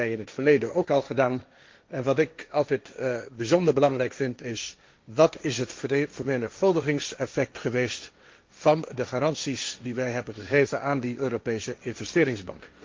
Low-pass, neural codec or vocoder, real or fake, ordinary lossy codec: 7.2 kHz; codec, 16 kHz, 1.1 kbps, Voila-Tokenizer; fake; Opus, 24 kbps